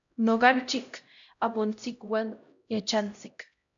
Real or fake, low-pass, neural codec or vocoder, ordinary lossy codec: fake; 7.2 kHz; codec, 16 kHz, 0.5 kbps, X-Codec, HuBERT features, trained on LibriSpeech; MP3, 64 kbps